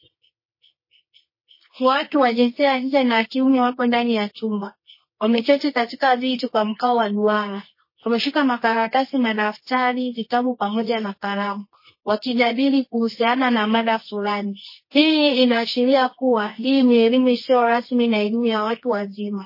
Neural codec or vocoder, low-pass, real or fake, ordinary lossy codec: codec, 24 kHz, 0.9 kbps, WavTokenizer, medium music audio release; 5.4 kHz; fake; MP3, 24 kbps